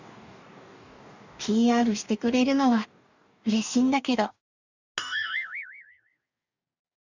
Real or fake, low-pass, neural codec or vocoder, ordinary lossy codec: fake; 7.2 kHz; codec, 44.1 kHz, 2.6 kbps, DAC; none